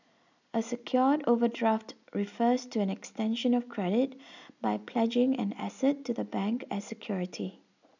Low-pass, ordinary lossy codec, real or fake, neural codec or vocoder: 7.2 kHz; none; real; none